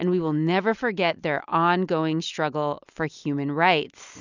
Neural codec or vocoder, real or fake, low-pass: none; real; 7.2 kHz